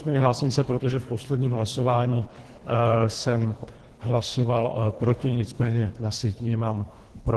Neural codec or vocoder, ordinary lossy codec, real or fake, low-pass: codec, 24 kHz, 1.5 kbps, HILCodec; Opus, 16 kbps; fake; 10.8 kHz